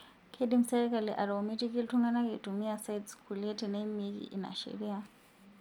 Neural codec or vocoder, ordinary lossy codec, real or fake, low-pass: none; none; real; 19.8 kHz